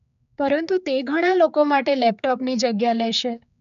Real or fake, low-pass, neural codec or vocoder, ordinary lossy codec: fake; 7.2 kHz; codec, 16 kHz, 4 kbps, X-Codec, HuBERT features, trained on general audio; AAC, 96 kbps